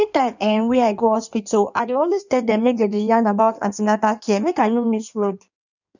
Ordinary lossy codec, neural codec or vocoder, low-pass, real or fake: none; codec, 16 kHz in and 24 kHz out, 1.1 kbps, FireRedTTS-2 codec; 7.2 kHz; fake